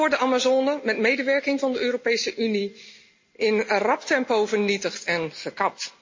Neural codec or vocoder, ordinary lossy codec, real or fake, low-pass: none; MP3, 32 kbps; real; 7.2 kHz